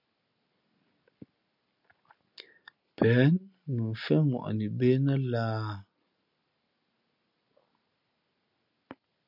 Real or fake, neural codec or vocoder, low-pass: real; none; 5.4 kHz